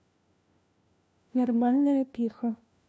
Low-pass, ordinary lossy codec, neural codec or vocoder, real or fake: none; none; codec, 16 kHz, 1 kbps, FunCodec, trained on LibriTTS, 50 frames a second; fake